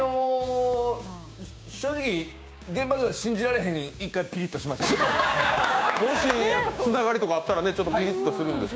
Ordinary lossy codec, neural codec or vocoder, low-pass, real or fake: none; codec, 16 kHz, 6 kbps, DAC; none; fake